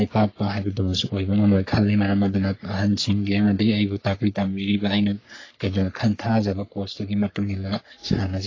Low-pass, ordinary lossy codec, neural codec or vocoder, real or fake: 7.2 kHz; AAC, 32 kbps; codec, 44.1 kHz, 3.4 kbps, Pupu-Codec; fake